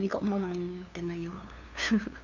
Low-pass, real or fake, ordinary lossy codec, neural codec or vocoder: 7.2 kHz; fake; none; codec, 16 kHz, 2 kbps, FunCodec, trained on LibriTTS, 25 frames a second